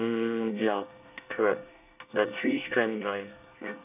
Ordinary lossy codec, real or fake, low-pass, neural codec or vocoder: none; fake; 3.6 kHz; codec, 24 kHz, 1 kbps, SNAC